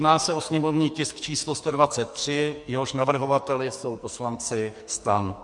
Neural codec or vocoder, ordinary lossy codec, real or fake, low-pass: codec, 44.1 kHz, 2.6 kbps, SNAC; MP3, 64 kbps; fake; 10.8 kHz